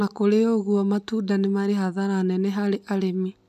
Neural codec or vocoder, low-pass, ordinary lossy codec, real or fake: none; 14.4 kHz; none; real